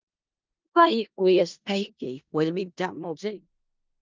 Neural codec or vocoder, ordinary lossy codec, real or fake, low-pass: codec, 16 kHz in and 24 kHz out, 0.4 kbps, LongCat-Audio-Codec, four codebook decoder; Opus, 24 kbps; fake; 7.2 kHz